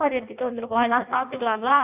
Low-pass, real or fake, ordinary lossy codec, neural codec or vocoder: 3.6 kHz; fake; none; codec, 16 kHz in and 24 kHz out, 0.6 kbps, FireRedTTS-2 codec